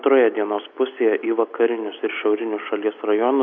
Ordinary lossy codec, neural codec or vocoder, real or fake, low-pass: MP3, 48 kbps; none; real; 7.2 kHz